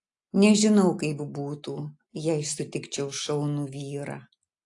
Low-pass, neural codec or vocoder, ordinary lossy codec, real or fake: 10.8 kHz; none; AAC, 48 kbps; real